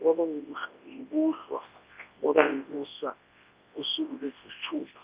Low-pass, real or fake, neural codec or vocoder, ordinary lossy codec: 3.6 kHz; fake; codec, 24 kHz, 0.9 kbps, WavTokenizer, large speech release; Opus, 24 kbps